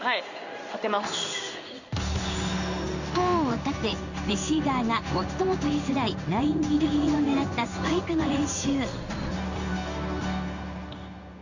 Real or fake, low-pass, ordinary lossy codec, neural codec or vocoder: fake; 7.2 kHz; none; codec, 16 kHz in and 24 kHz out, 1 kbps, XY-Tokenizer